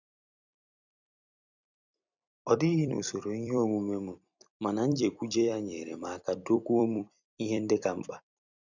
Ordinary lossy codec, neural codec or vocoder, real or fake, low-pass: none; vocoder, 44.1 kHz, 128 mel bands every 256 samples, BigVGAN v2; fake; 7.2 kHz